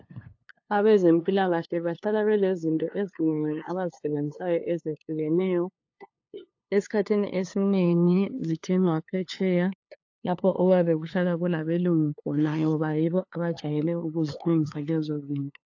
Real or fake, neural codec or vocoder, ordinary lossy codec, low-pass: fake; codec, 16 kHz, 2 kbps, FunCodec, trained on LibriTTS, 25 frames a second; AAC, 48 kbps; 7.2 kHz